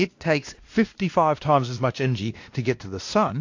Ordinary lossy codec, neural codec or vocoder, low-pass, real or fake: AAC, 48 kbps; codec, 16 kHz, 1 kbps, X-Codec, WavLM features, trained on Multilingual LibriSpeech; 7.2 kHz; fake